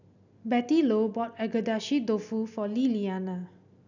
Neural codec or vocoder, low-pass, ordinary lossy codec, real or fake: none; 7.2 kHz; none; real